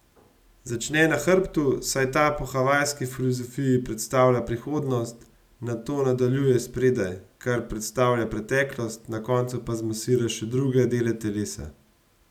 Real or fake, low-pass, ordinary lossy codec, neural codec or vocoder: real; 19.8 kHz; none; none